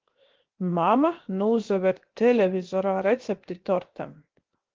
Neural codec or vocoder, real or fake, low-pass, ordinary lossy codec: codec, 16 kHz, 0.7 kbps, FocalCodec; fake; 7.2 kHz; Opus, 16 kbps